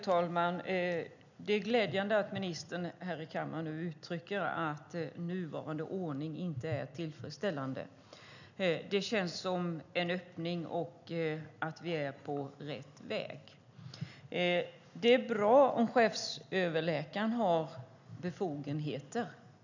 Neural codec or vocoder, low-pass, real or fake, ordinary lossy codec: none; 7.2 kHz; real; none